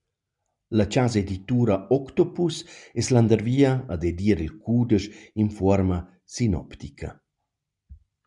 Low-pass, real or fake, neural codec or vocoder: 10.8 kHz; real; none